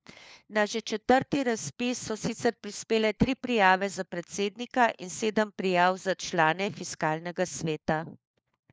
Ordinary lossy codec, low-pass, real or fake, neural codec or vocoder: none; none; fake; codec, 16 kHz, 4 kbps, FunCodec, trained on LibriTTS, 50 frames a second